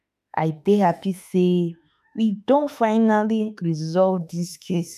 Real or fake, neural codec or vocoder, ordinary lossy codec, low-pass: fake; autoencoder, 48 kHz, 32 numbers a frame, DAC-VAE, trained on Japanese speech; none; 14.4 kHz